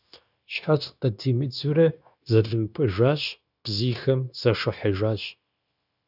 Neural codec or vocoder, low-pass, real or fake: codec, 16 kHz, 0.9 kbps, LongCat-Audio-Codec; 5.4 kHz; fake